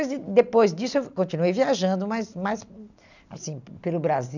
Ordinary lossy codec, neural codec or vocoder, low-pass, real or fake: none; none; 7.2 kHz; real